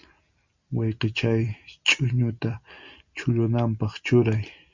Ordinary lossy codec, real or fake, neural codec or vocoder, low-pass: Opus, 64 kbps; real; none; 7.2 kHz